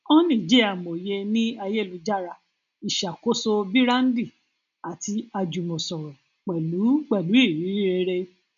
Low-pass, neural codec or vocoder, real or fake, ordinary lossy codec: 7.2 kHz; none; real; none